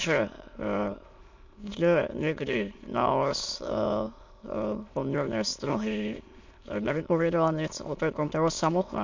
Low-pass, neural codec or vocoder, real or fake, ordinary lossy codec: 7.2 kHz; autoencoder, 22.05 kHz, a latent of 192 numbers a frame, VITS, trained on many speakers; fake; MP3, 48 kbps